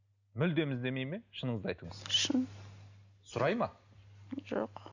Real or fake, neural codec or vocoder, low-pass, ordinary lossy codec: real; none; 7.2 kHz; none